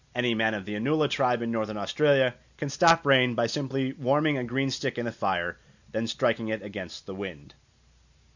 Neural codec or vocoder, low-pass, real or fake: none; 7.2 kHz; real